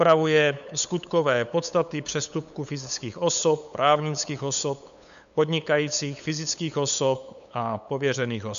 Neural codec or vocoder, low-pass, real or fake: codec, 16 kHz, 8 kbps, FunCodec, trained on LibriTTS, 25 frames a second; 7.2 kHz; fake